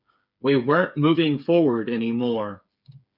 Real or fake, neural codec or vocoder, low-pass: fake; codec, 16 kHz, 8 kbps, FreqCodec, smaller model; 5.4 kHz